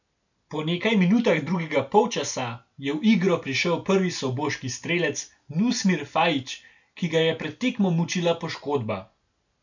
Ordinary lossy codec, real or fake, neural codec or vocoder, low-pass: none; real; none; 7.2 kHz